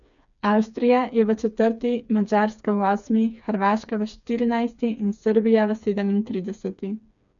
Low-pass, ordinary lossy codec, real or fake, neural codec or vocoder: 7.2 kHz; none; fake; codec, 16 kHz, 4 kbps, FreqCodec, smaller model